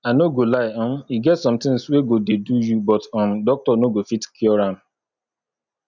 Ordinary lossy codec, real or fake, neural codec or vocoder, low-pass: none; real; none; 7.2 kHz